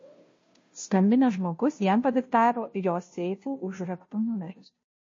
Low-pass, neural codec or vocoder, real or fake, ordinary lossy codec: 7.2 kHz; codec, 16 kHz, 0.5 kbps, FunCodec, trained on Chinese and English, 25 frames a second; fake; MP3, 32 kbps